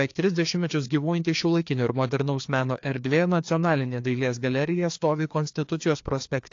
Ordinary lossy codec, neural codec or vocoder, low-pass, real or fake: AAC, 48 kbps; codec, 16 kHz, 2 kbps, FreqCodec, larger model; 7.2 kHz; fake